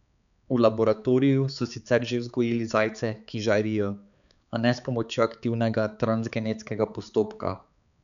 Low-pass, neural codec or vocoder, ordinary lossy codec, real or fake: 7.2 kHz; codec, 16 kHz, 4 kbps, X-Codec, HuBERT features, trained on balanced general audio; none; fake